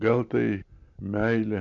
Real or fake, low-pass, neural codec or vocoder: real; 7.2 kHz; none